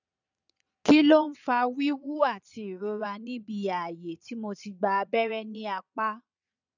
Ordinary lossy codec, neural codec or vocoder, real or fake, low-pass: none; vocoder, 22.05 kHz, 80 mel bands, Vocos; fake; 7.2 kHz